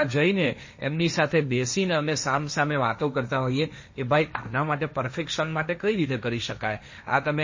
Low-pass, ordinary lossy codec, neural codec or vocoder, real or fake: 7.2 kHz; MP3, 32 kbps; codec, 16 kHz, 1.1 kbps, Voila-Tokenizer; fake